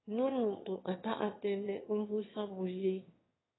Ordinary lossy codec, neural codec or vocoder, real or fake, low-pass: AAC, 16 kbps; autoencoder, 22.05 kHz, a latent of 192 numbers a frame, VITS, trained on one speaker; fake; 7.2 kHz